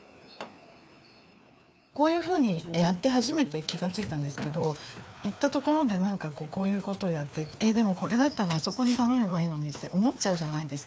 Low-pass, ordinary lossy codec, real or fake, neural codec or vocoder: none; none; fake; codec, 16 kHz, 2 kbps, FreqCodec, larger model